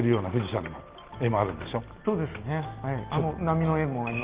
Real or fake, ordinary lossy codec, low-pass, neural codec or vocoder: real; Opus, 16 kbps; 3.6 kHz; none